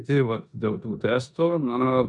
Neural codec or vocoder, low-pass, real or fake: codec, 16 kHz in and 24 kHz out, 0.9 kbps, LongCat-Audio-Codec, four codebook decoder; 10.8 kHz; fake